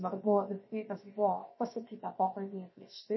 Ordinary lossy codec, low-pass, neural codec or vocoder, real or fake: MP3, 24 kbps; 7.2 kHz; codec, 16 kHz, about 1 kbps, DyCAST, with the encoder's durations; fake